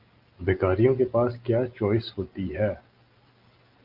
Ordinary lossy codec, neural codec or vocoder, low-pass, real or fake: Opus, 24 kbps; none; 5.4 kHz; real